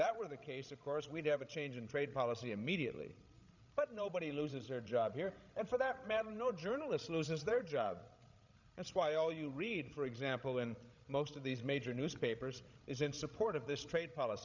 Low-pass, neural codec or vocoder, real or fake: 7.2 kHz; codec, 16 kHz, 16 kbps, FreqCodec, larger model; fake